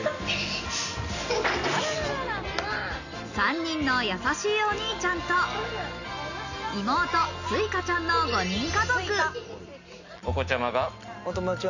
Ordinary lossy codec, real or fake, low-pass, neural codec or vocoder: none; real; 7.2 kHz; none